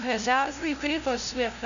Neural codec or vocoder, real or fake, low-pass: codec, 16 kHz, 0.5 kbps, FunCodec, trained on LibriTTS, 25 frames a second; fake; 7.2 kHz